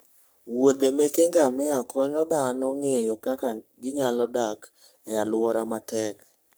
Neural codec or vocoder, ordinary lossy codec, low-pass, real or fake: codec, 44.1 kHz, 3.4 kbps, Pupu-Codec; none; none; fake